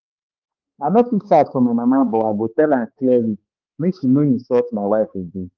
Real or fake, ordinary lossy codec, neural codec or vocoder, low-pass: fake; Opus, 32 kbps; codec, 16 kHz, 2 kbps, X-Codec, HuBERT features, trained on balanced general audio; 7.2 kHz